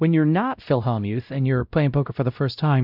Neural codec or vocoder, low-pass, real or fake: codec, 16 kHz, 0.5 kbps, X-Codec, WavLM features, trained on Multilingual LibriSpeech; 5.4 kHz; fake